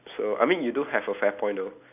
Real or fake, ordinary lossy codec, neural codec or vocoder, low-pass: real; none; none; 3.6 kHz